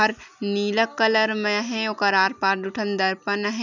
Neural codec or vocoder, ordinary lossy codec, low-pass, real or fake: none; none; 7.2 kHz; real